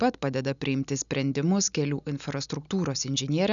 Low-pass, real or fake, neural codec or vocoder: 7.2 kHz; real; none